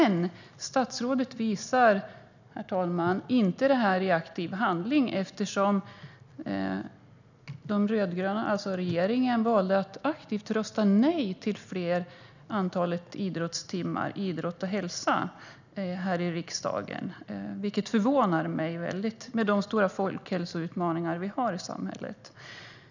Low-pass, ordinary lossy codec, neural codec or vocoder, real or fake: 7.2 kHz; none; none; real